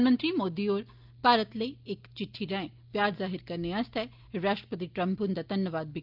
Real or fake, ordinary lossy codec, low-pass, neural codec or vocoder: real; Opus, 24 kbps; 5.4 kHz; none